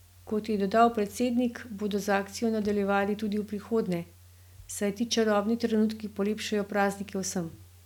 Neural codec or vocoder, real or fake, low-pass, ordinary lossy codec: none; real; 19.8 kHz; none